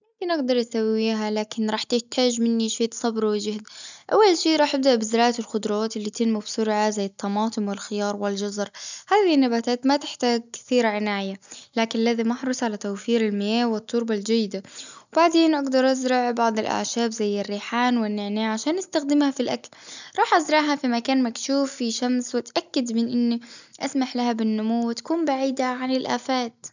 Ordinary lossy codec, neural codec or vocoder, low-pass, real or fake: none; none; 7.2 kHz; real